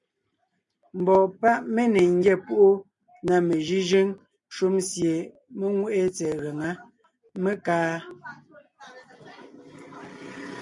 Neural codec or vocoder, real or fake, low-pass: none; real; 10.8 kHz